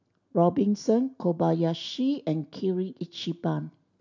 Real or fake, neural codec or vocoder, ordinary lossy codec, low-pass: real; none; none; 7.2 kHz